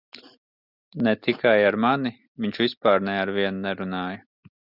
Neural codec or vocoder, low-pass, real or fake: none; 5.4 kHz; real